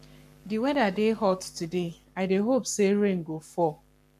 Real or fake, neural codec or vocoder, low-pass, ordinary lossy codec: fake; codec, 44.1 kHz, 7.8 kbps, Pupu-Codec; 14.4 kHz; none